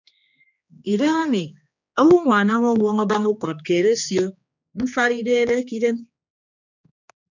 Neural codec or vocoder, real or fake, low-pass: codec, 16 kHz, 2 kbps, X-Codec, HuBERT features, trained on general audio; fake; 7.2 kHz